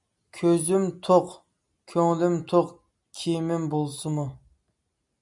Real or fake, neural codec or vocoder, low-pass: real; none; 10.8 kHz